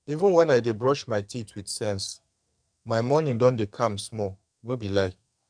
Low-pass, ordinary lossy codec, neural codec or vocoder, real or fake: 9.9 kHz; none; codec, 44.1 kHz, 2.6 kbps, SNAC; fake